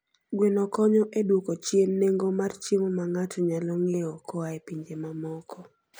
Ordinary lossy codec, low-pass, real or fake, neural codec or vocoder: none; none; real; none